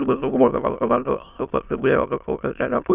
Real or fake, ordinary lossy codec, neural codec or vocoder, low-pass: fake; Opus, 64 kbps; autoencoder, 22.05 kHz, a latent of 192 numbers a frame, VITS, trained on many speakers; 3.6 kHz